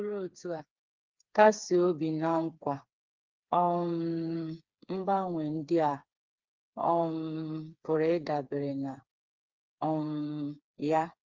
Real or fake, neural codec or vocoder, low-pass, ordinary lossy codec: fake; codec, 16 kHz, 4 kbps, FreqCodec, smaller model; 7.2 kHz; Opus, 32 kbps